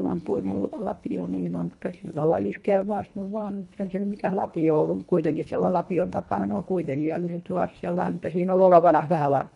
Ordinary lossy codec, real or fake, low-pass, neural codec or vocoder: none; fake; 10.8 kHz; codec, 24 kHz, 1.5 kbps, HILCodec